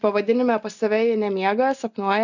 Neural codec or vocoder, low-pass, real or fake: none; 7.2 kHz; real